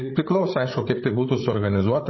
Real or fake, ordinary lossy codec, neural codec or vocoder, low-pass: fake; MP3, 24 kbps; codec, 16 kHz, 8 kbps, FreqCodec, larger model; 7.2 kHz